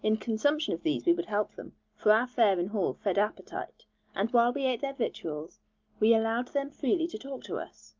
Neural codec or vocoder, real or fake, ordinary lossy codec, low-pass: none; real; Opus, 24 kbps; 7.2 kHz